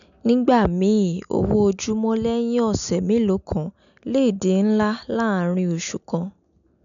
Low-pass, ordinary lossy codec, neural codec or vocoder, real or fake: 7.2 kHz; none; none; real